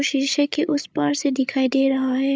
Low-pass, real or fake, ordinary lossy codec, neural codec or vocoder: none; fake; none; codec, 16 kHz, 8 kbps, FreqCodec, larger model